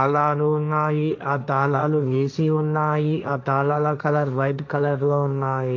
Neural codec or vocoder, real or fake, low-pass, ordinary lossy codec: codec, 16 kHz, 1.1 kbps, Voila-Tokenizer; fake; 7.2 kHz; none